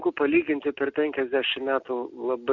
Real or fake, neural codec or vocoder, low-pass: real; none; 7.2 kHz